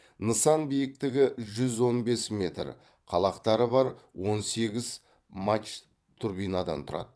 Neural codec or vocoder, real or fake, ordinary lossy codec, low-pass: vocoder, 22.05 kHz, 80 mel bands, WaveNeXt; fake; none; none